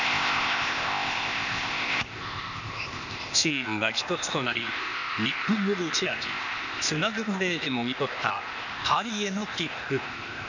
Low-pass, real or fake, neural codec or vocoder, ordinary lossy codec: 7.2 kHz; fake; codec, 16 kHz, 0.8 kbps, ZipCodec; none